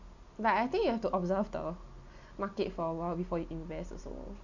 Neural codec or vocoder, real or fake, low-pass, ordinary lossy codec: none; real; 7.2 kHz; none